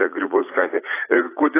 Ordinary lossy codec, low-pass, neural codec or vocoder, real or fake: AAC, 16 kbps; 3.6 kHz; vocoder, 44.1 kHz, 80 mel bands, Vocos; fake